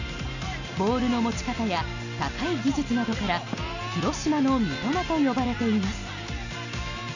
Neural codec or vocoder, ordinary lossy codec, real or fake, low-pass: none; none; real; 7.2 kHz